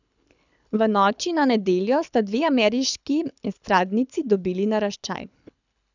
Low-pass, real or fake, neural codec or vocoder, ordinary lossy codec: 7.2 kHz; fake; codec, 24 kHz, 6 kbps, HILCodec; none